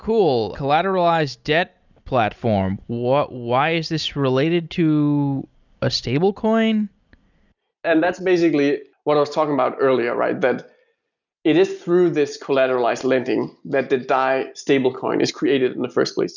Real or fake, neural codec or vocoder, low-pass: real; none; 7.2 kHz